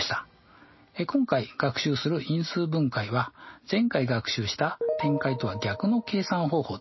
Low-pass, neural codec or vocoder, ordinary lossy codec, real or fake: 7.2 kHz; none; MP3, 24 kbps; real